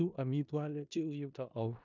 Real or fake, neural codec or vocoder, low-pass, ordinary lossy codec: fake; codec, 16 kHz in and 24 kHz out, 0.4 kbps, LongCat-Audio-Codec, four codebook decoder; 7.2 kHz; none